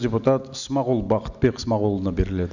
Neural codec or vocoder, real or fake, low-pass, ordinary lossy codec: none; real; 7.2 kHz; none